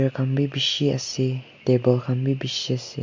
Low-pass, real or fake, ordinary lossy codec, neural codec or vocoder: 7.2 kHz; real; MP3, 48 kbps; none